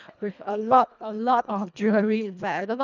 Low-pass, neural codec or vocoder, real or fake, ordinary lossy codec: 7.2 kHz; codec, 24 kHz, 1.5 kbps, HILCodec; fake; none